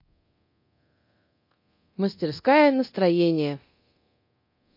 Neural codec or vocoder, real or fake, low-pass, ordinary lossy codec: codec, 24 kHz, 0.9 kbps, DualCodec; fake; 5.4 kHz; MP3, 32 kbps